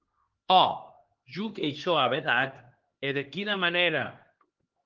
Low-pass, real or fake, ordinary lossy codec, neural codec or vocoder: 7.2 kHz; fake; Opus, 16 kbps; codec, 16 kHz, 2 kbps, X-Codec, HuBERT features, trained on LibriSpeech